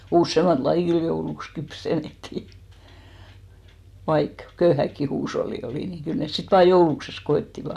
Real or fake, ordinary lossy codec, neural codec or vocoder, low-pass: real; none; none; 14.4 kHz